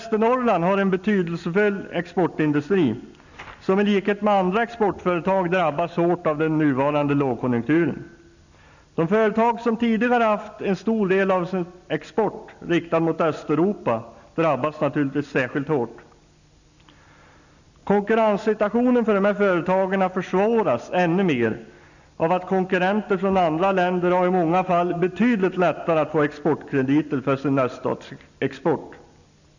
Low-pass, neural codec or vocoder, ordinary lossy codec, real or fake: 7.2 kHz; none; none; real